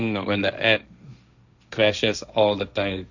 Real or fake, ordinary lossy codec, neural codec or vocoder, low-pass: fake; none; codec, 16 kHz, 1.1 kbps, Voila-Tokenizer; 7.2 kHz